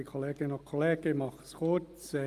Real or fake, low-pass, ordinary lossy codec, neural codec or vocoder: real; 14.4 kHz; Opus, 32 kbps; none